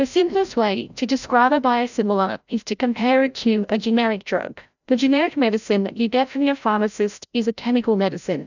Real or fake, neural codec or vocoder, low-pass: fake; codec, 16 kHz, 0.5 kbps, FreqCodec, larger model; 7.2 kHz